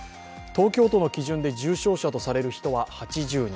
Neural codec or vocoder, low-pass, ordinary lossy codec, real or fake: none; none; none; real